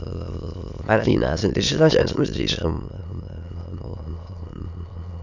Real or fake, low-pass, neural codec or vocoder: fake; 7.2 kHz; autoencoder, 22.05 kHz, a latent of 192 numbers a frame, VITS, trained on many speakers